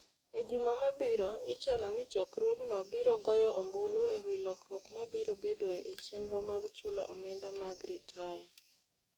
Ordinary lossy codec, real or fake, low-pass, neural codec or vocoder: Opus, 64 kbps; fake; 19.8 kHz; codec, 44.1 kHz, 2.6 kbps, DAC